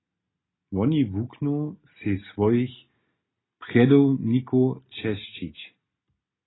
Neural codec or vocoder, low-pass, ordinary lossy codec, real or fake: none; 7.2 kHz; AAC, 16 kbps; real